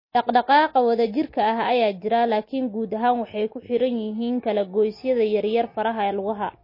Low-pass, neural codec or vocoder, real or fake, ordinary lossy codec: 5.4 kHz; none; real; MP3, 24 kbps